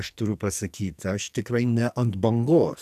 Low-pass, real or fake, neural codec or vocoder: 14.4 kHz; fake; codec, 44.1 kHz, 2.6 kbps, SNAC